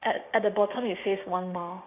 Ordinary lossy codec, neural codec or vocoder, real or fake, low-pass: AAC, 32 kbps; codec, 16 kHz, 6 kbps, DAC; fake; 3.6 kHz